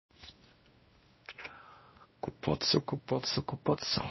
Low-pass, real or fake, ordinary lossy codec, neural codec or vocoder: 7.2 kHz; fake; MP3, 24 kbps; codec, 16 kHz, 1 kbps, X-Codec, WavLM features, trained on Multilingual LibriSpeech